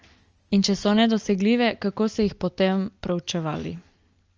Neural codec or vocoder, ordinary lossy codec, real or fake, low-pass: none; Opus, 24 kbps; real; 7.2 kHz